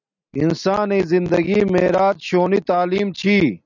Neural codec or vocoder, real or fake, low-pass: none; real; 7.2 kHz